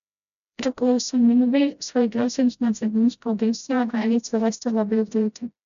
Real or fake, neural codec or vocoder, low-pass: fake; codec, 16 kHz, 0.5 kbps, FreqCodec, smaller model; 7.2 kHz